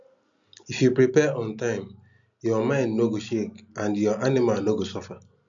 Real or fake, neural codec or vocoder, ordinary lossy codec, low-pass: real; none; none; 7.2 kHz